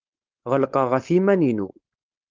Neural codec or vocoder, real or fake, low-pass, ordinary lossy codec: codec, 16 kHz, 4.8 kbps, FACodec; fake; 7.2 kHz; Opus, 24 kbps